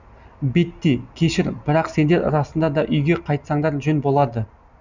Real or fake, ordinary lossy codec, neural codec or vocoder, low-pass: real; none; none; 7.2 kHz